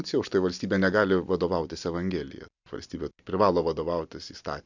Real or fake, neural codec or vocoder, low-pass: real; none; 7.2 kHz